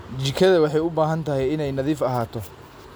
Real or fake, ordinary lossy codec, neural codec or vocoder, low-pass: real; none; none; none